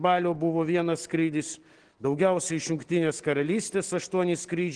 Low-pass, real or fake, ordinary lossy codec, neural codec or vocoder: 9.9 kHz; real; Opus, 16 kbps; none